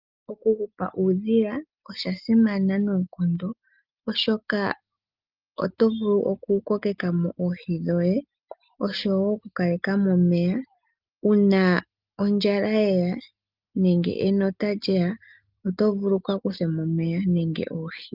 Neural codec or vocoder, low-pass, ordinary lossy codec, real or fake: none; 5.4 kHz; Opus, 24 kbps; real